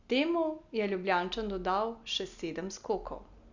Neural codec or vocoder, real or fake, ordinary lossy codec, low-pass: none; real; Opus, 64 kbps; 7.2 kHz